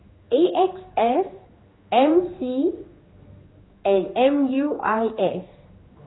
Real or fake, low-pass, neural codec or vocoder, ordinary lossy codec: fake; 7.2 kHz; codec, 16 kHz, 2 kbps, X-Codec, HuBERT features, trained on general audio; AAC, 16 kbps